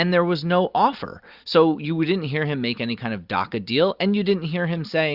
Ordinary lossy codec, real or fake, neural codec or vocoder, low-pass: Opus, 64 kbps; real; none; 5.4 kHz